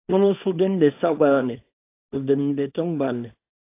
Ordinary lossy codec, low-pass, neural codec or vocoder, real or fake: AAC, 24 kbps; 3.6 kHz; codec, 24 kHz, 0.9 kbps, WavTokenizer, small release; fake